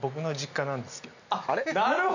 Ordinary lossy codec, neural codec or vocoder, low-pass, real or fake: none; none; 7.2 kHz; real